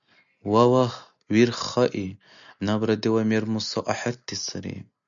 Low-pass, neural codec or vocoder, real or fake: 7.2 kHz; none; real